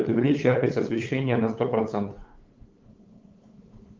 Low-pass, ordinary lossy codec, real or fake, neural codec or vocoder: 7.2 kHz; Opus, 32 kbps; fake; codec, 16 kHz, 8 kbps, FunCodec, trained on LibriTTS, 25 frames a second